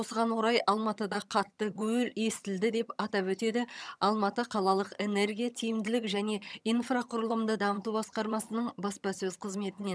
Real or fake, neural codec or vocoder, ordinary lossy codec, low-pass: fake; vocoder, 22.05 kHz, 80 mel bands, HiFi-GAN; none; none